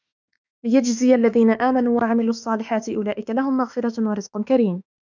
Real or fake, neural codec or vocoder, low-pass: fake; autoencoder, 48 kHz, 32 numbers a frame, DAC-VAE, trained on Japanese speech; 7.2 kHz